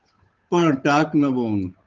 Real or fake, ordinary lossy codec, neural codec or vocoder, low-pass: fake; Opus, 24 kbps; codec, 16 kHz, 8 kbps, FunCodec, trained on Chinese and English, 25 frames a second; 7.2 kHz